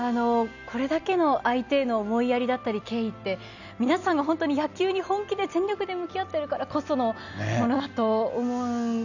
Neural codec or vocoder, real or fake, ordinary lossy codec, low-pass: none; real; none; 7.2 kHz